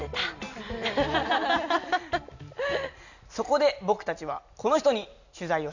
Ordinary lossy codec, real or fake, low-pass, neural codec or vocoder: none; real; 7.2 kHz; none